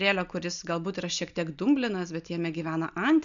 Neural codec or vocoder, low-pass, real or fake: none; 7.2 kHz; real